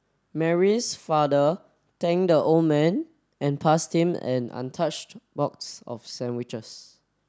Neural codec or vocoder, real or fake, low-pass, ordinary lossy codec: none; real; none; none